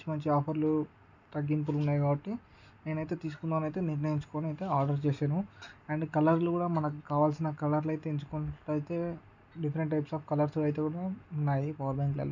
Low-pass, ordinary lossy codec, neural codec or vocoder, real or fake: 7.2 kHz; none; none; real